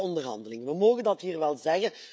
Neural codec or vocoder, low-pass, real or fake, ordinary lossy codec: codec, 16 kHz, 16 kbps, FreqCodec, smaller model; none; fake; none